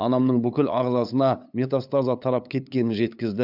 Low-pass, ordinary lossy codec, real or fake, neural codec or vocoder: 5.4 kHz; AAC, 48 kbps; fake; codec, 16 kHz, 8 kbps, FunCodec, trained on LibriTTS, 25 frames a second